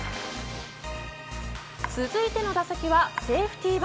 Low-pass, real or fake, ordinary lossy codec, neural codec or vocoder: none; real; none; none